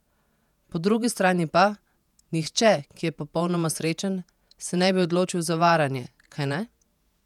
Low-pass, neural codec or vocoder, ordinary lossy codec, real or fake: 19.8 kHz; vocoder, 48 kHz, 128 mel bands, Vocos; none; fake